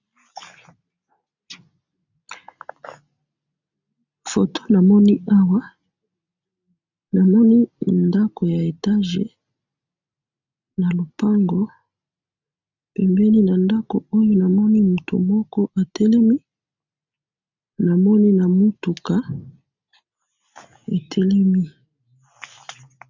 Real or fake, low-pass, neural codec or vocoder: real; 7.2 kHz; none